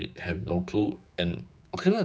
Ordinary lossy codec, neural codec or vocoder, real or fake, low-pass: none; codec, 16 kHz, 4 kbps, X-Codec, HuBERT features, trained on general audio; fake; none